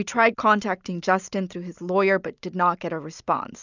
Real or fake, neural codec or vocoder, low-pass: real; none; 7.2 kHz